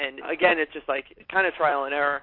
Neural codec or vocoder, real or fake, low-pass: none; real; 5.4 kHz